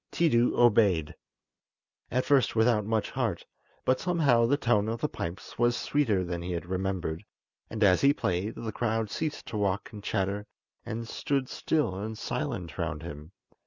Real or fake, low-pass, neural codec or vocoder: real; 7.2 kHz; none